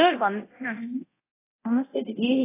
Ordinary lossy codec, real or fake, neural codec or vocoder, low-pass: AAC, 16 kbps; fake; codec, 24 kHz, 0.9 kbps, DualCodec; 3.6 kHz